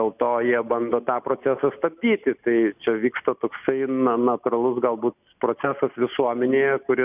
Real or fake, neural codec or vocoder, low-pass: real; none; 3.6 kHz